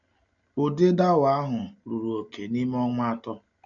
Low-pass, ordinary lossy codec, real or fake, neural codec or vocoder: 7.2 kHz; none; real; none